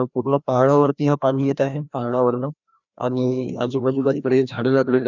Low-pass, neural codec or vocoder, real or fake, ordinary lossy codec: 7.2 kHz; codec, 16 kHz, 1 kbps, FreqCodec, larger model; fake; none